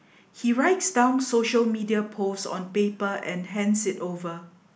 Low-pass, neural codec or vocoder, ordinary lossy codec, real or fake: none; none; none; real